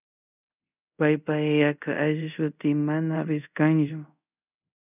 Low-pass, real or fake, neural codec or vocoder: 3.6 kHz; fake; codec, 24 kHz, 0.5 kbps, DualCodec